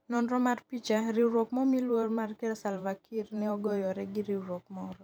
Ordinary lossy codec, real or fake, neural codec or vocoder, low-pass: none; fake; vocoder, 44.1 kHz, 128 mel bands every 512 samples, BigVGAN v2; 19.8 kHz